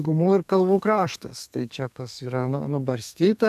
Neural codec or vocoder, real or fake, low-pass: codec, 32 kHz, 1.9 kbps, SNAC; fake; 14.4 kHz